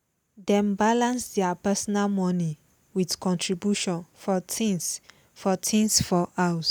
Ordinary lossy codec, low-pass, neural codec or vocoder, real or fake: none; none; none; real